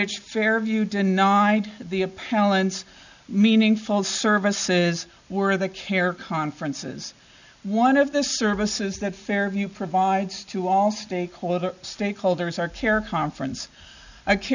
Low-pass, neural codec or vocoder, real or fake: 7.2 kHz; none; real